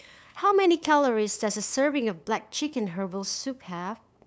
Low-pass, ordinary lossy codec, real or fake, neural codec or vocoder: none; none; fake; codec, 16 kHz, 8 kbps, FunCodec, trained on LibriTTS, 25 frames a second